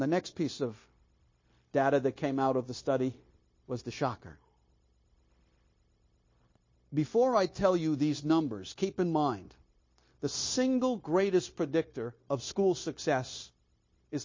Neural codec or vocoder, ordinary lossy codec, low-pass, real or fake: codec, 16 kHz, 0.9 kbps, LongCat-Audio-Codec; MP3, 32 kbps; 7.2 kHz; fake